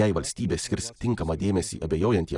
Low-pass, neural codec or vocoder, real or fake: 10.8 kHz; none; real